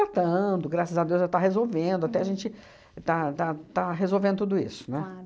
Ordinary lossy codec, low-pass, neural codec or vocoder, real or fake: none; none; none; real